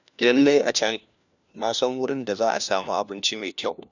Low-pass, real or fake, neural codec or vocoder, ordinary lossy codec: 7.2 kHz; fake; codec, 16 kHz, 1 kbps, FunCodec, trained on LibriTTS, 50 frames a second; none